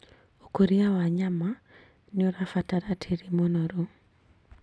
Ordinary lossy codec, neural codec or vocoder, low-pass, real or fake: none; none; none; real